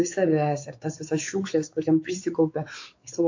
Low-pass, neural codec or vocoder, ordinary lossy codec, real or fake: 7.2 kHz; codec, 16 kHz, 4 kbps, X-Codec, WavLM features, trained on Multilingual LibriSpeech; AAC, 48 kbps; fake